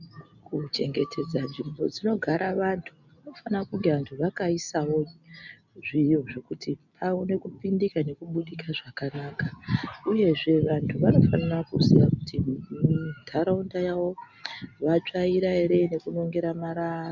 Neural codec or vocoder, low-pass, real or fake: none; 7.2 kHz; real